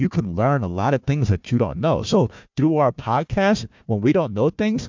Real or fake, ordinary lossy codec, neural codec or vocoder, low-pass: fake; MP3, 64 kbps; codec, 16 kHz, 1 kbps, FunCodec, trained on Chinese and English, 50 frames a second; 7.2 kHz